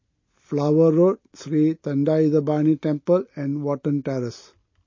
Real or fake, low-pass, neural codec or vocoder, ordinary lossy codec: real; 7.2 kHz; none; MP3, 32 kbps